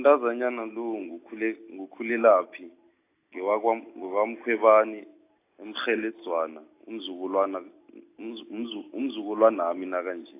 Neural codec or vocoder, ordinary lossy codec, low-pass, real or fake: none; AAC, 24 kbps; 3.6 kHz; real